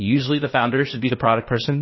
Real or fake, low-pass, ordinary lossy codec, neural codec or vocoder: fake; 7.2 kHz; MP3, 24 kbps; codec, 16 kHz, 0.8 kbps, ZipCodec